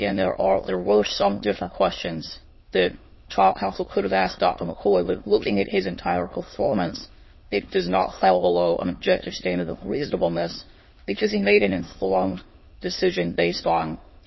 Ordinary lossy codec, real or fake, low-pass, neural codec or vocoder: MP3, 24 kbps; fake; 7.2 kHz; autoencoder, 22.05 kHz, a latent of 192 numbers a frame, VITS, trained on many speakers